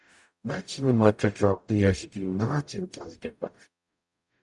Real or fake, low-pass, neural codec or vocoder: fake; 10.8 kHz; codec, 44.1 kHz, 0.9 kbps, DAC